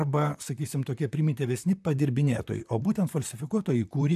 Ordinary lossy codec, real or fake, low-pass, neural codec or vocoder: AAC, 96 kbps; fake; 14.4 kHz; vocoder, 44.1 kHz, 128 mel bands, Pupu-Vocoder